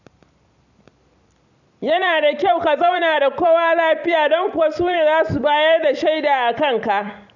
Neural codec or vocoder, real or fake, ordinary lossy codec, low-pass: vocoder, 44.1 kHz, 128 mel bands every 256 samples, BigVGAN v2; fake; none; 7.2 kHz